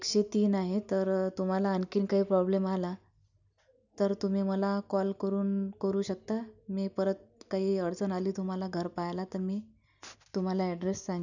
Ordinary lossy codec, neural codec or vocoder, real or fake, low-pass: none; none; real; 7.2 kHz